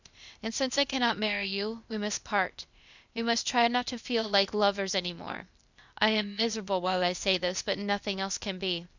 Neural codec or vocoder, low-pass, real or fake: codec, 16 kHz, 0.8 kbps, ZipCodec; 7.2 kHz; fake